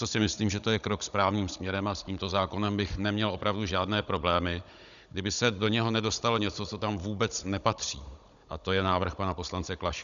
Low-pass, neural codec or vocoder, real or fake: 7.2 kHz; codec, 16 kHz, 16 kbps, FunCodec, trained on Chinese and English, 50 frames a second; fake